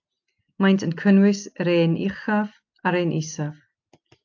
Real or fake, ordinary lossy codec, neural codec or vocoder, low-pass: real; AAC, 48 kbps; none; 7.2 kHz